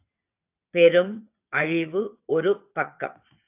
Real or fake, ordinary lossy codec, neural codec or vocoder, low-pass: fake; AAC, 32 kbps; vocoder, 22.05 kHz, 80 mel bands, WaveNeXt; 3.6 kHz